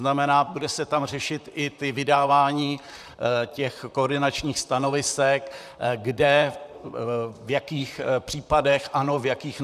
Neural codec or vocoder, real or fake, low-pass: vocoder, 44.1 kHz, 128 mel bands, Pupu-Vocoder; fake; 14.4 kHz